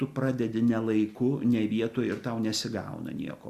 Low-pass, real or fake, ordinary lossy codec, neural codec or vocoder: 14.4 kHz; real; Opus, 64 kbps; none